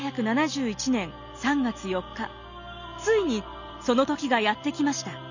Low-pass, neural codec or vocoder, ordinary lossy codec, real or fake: 7.2 kHz; none; none; real